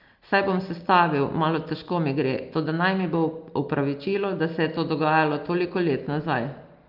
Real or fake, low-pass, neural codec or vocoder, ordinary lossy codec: real; 5.4 kHz; none; Opus, 32 kbps